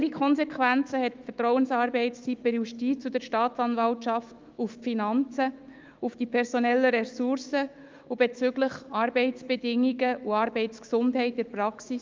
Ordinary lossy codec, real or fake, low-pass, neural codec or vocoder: Opus, 32 kbps; fake; 7.2 kHz; autoencoder, 48 kHz, 128 numbers a frame, DAC-VAE, trained on Japanese speech